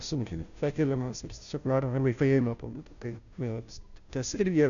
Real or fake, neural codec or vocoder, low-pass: fake; codec, 16 kHz, 0.5 kbps, FunCodec, trained on Chinese and English, 25 frames a second; 7.2 kHz